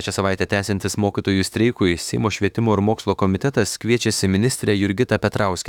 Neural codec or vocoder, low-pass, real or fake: autoencoder, 48 kHz, 32 numbers a frame, DAC-VAE, trained on Japanese speech; 19.8 kHz; fake